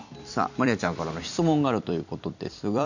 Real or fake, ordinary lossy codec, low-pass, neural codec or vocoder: fake; none; 7.2 kHz; vocoder, 44.1 kHz, 128 mel bands every 256 samples, BigVGAN v2